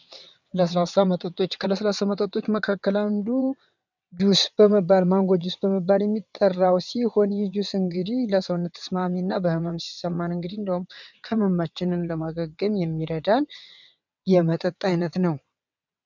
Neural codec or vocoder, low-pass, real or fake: vocoder, 22.05 kHz, 80 mel bands, WaveNeXt; 7.2 kHz; fake